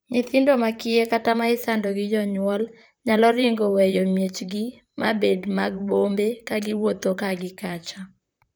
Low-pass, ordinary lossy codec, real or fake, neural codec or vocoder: none; none; fake; vocoder, 44.1 kHz, 128 mel bands, Pupu-Vocoder